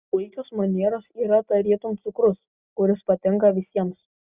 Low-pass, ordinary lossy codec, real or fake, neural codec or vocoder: 3.6 kHz; Opus, 64 kbps; real; none